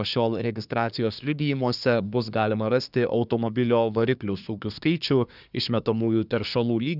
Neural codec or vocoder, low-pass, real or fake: codec, 24 kHz, 1 kbps, SNAC; 5.4 kHz; fake